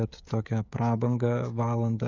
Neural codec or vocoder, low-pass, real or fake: codec, 16 kHz, 16 kbps, FreqCodec, smaller model; 7.2 kHz; fake